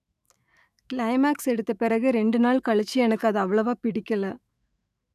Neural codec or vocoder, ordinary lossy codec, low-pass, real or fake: autoencoder, 48 kHz, 128 numbers a frame, DAC-VAE, trained on Japanese speech; none; 14.4 kHz; fake